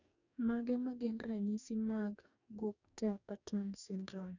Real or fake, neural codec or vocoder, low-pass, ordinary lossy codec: fake; codec, 44.1 kHz, 2.6 kbps, DAC; 7.2 kHz; none